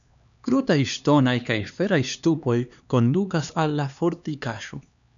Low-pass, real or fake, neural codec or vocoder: 7.2 kHz; fake; codec, 16 kHz, 2 kbps, X-Codec, HuBERT features, trained on LibriSpeech